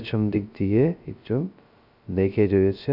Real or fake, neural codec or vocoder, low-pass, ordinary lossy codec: fake; codec, 16 kHz, 0.2 kbps, FocalCodec; 5.4 kHz; none